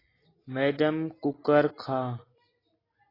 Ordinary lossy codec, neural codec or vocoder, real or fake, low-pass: AAC, 24 kbps; none; real; 5.4 kHz